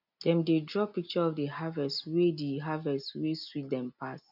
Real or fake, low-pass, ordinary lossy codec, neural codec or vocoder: real; 5.4 kHz; none; none